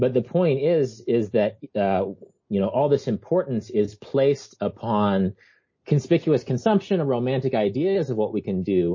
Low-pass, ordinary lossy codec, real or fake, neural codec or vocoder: 7.2 kHz; MP3, 32 kbps; real; none